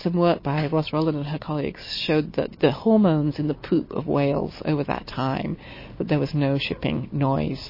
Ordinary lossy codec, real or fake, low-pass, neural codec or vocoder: MP3, 24 kbps; real; 5.4 kHz; none